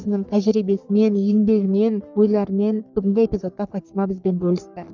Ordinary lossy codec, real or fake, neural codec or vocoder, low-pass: none; fake; codec, 44.1 kHz, 3.4 kbps, Pupu-Codec; 7.2 kHz